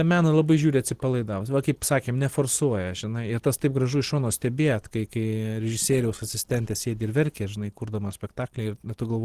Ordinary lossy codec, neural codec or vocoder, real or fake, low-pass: Opus, 16 kbps; none; real; 14.4 kHz